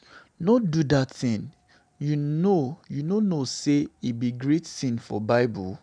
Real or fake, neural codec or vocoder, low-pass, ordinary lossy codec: real; none; 9.9 kHz; none